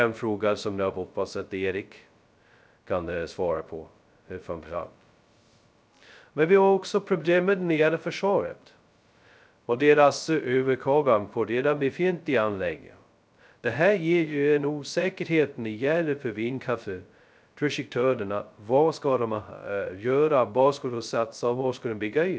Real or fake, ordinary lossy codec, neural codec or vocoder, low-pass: fake; none; codec, 16 kHz, 0.2 kbps, FocalCodec; none